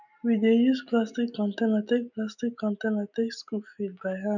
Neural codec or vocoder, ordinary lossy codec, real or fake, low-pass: none; none; real; 7.2 kHz